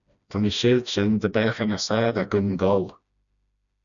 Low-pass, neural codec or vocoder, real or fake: 7.2 kHz; codec, 16 kHz, 1 kbps, FreqCodec, smaller model; fake